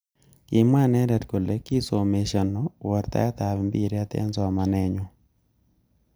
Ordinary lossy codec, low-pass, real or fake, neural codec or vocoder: none; none; real; none